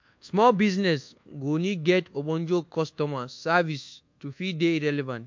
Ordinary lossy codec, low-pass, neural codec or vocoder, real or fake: MP3, 48 kbps; 7.2 kHz; codec, 24 kHz, 1.2 kbps, DualCodec; fake